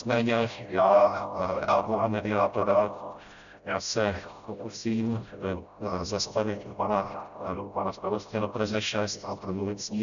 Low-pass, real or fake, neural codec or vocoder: 7.2 kHz; fake; codec, 16 kHz, 0.5 kbps, FreqCodec, smaller model